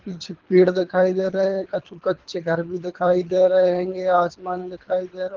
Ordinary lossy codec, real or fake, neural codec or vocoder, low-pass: Opus, 16 kbps; fake; codec, 24 kHz, 3 kbps, HILCodec; 7.2 kHz